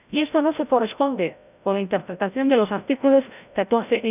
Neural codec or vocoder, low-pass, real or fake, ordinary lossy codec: codec, 16 kHz, 0.5 kbps, FreqCodec, larger model; 3.6 kHz; fake; none